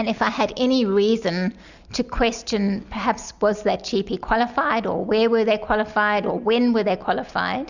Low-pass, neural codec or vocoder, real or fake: 7.2 kHz; none; real